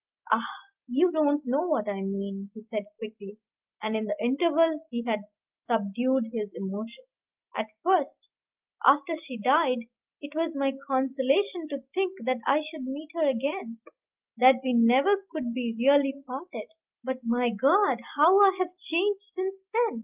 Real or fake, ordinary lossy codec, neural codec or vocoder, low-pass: real; Opus, 24 kbps; none; 3.6 kHz